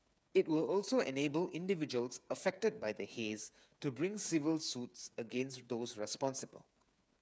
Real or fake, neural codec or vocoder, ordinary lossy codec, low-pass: fake; codec, 16 kHz, 8 kbps, FreqCodec, smaller model; none; none